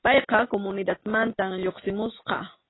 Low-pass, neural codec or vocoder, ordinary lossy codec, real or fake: 7.2 kHz; none; AAC, 16 kbps; real